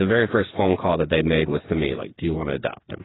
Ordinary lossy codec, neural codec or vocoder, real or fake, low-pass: AAC, 16 kbps; codec, 16 kHz, 4 kbps, FreqCodec, smaller model; fake; 7.2 kHz